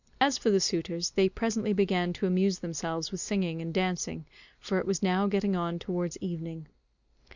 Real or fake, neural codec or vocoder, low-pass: real; none; 7.2 kHz